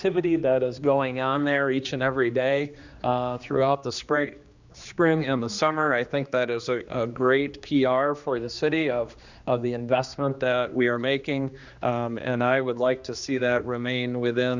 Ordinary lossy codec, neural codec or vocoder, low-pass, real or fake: Opus, 64 kbps; codec, 16 kHz, 2 kbps, X-Codec, HuBERT features, trained on general audio; 7.2 kHz; fake